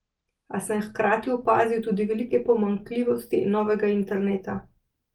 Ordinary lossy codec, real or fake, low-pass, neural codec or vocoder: Opus, 24 kbps; real; 19.8 kHz; none